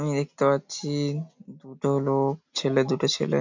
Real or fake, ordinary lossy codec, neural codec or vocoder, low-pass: real; MP3, 48 kbps; none; 7.2 kHz